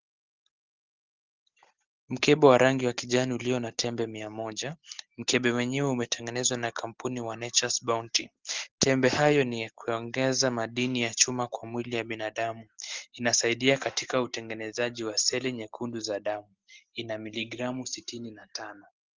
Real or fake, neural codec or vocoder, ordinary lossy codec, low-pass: real; none; Opus, 16 kbps; 7.2 kHz